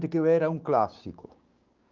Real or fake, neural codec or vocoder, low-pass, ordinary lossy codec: fake; codec, 16 kHz, 6 kbps, DAC; 7.2 kHz; Opus, 24 kbps